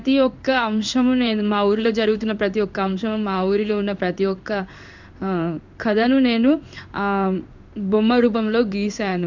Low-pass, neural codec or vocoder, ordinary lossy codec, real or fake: 7.2 kHz; codec, 16 kHz in and 24 kHz out, 1 kbps, XY-Tokenizer; none; fake